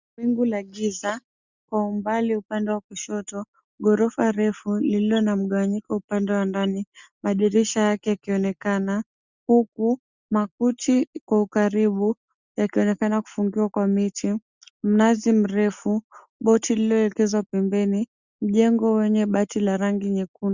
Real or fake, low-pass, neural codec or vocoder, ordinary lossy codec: real; 7.2 kHz; none; Opus, 64 kbps